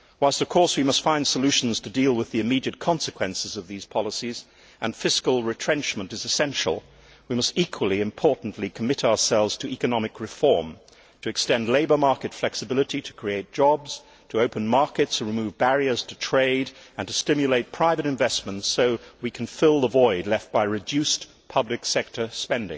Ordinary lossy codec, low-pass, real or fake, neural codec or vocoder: none; none; real; none